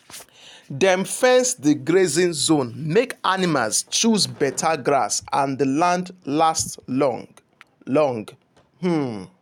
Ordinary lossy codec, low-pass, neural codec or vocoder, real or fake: none; 19.8 kHz; none; real